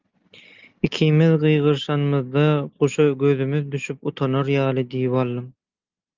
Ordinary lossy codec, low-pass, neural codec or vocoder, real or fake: Opus, 32 kbps; 7.2 kHz; none; real